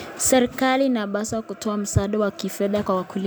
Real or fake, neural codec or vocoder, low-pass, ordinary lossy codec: real; none; none; none